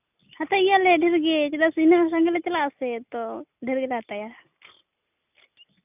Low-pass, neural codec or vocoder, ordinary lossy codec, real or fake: 3.6 kHz; none; none; real